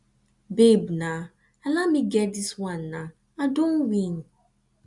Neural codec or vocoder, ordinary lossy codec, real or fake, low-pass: none; none; real; 10.8 kHz